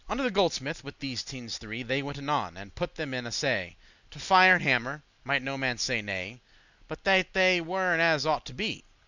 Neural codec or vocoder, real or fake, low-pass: none; real; 7.2 kHz